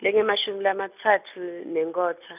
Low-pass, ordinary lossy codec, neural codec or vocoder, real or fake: 3.6 kHz; none; none; real